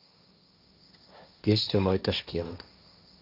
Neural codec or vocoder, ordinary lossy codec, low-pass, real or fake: codec, 16 kHz, 1.1 kbps, Voila-Tokenizer; none; 5.4 kHz; fake